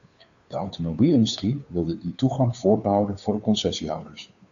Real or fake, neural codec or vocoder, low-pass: fake; codec, 16 kHz, 2 kbps, FunCodec, trained on Chinese and English, 25 frames a second; 7.2 kHz